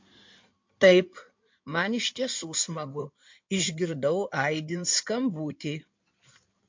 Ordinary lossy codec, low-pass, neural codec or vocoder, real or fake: MP3, 48 kbps; 7.2 kHz; codec, 16 kHz in and 24 kHz out, 2.2 kbps, FireRedTTS-2 codec; fake